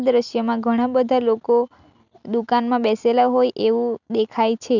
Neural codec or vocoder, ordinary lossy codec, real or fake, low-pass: none; none; real; 7.2 kHz